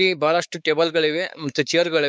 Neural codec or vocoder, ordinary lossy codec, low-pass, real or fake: codec, 16 kHz, 4 kbps, X-Codec, WavLM features, trained on Multilingual LibriSpeech; none; none; fake